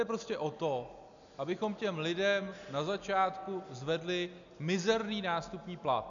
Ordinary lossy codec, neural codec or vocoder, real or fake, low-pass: MP3, 96 kbps; none; real; 7.2 kHz